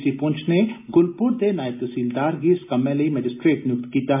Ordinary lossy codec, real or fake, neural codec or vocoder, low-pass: MP3, 32 kbps; real; none; 3.6 kHz